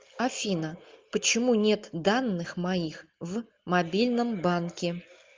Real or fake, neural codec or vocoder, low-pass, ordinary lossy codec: real; none; 7.2 kHz; Opus, 24 kbps